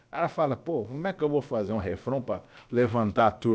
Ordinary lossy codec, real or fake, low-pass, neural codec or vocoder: none; fake; none; codec, 16 kHz, about 1 kbps, DyCAST, with the encoder's durations